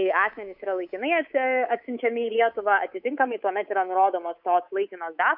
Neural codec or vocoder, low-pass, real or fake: codec, 24 kHz, 3.1 kbps, DualCodec; 5.4 kHz; fake